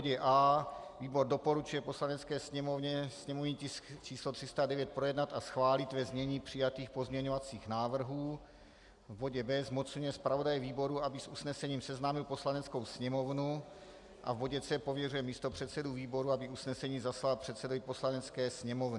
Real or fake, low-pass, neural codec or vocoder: real; 10.8 kHz; none